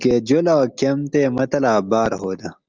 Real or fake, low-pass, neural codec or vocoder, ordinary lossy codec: real; 7.2 kHz; none; Opus, 24 kbps